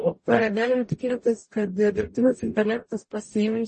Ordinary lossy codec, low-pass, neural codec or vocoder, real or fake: MP3, 32 kbps; 10.8 kHz; codec, 44.1 kHz, 0.9 kbps, DAC; fake